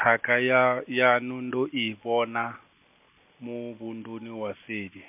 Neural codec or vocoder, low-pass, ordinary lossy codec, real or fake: none; 3.6 kHz; MP3, 32 kbps; real